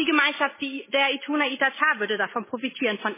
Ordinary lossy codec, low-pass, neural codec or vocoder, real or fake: MP3, 16 kbps; 3.6 kHz; none; real